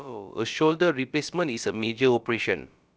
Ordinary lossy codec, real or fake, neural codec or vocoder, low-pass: none; fake; codec, 16 kHz, about 1 kbps, DyCAST, with the encoder's durations; none